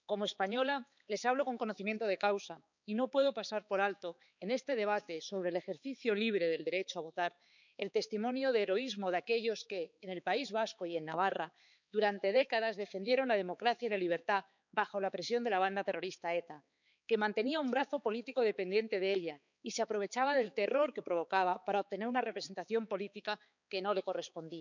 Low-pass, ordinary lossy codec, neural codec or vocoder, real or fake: 7.2 kHz; none; codec, 16 kHz, 4 kbps, X-Codec, HuBERT features, trained on balanced general audio; fake